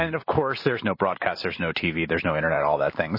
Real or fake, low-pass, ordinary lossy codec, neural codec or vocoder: real; 5.4 kHz; MP3, 24 kbps; none